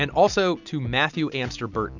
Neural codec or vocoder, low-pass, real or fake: none; 7.2 kHz; real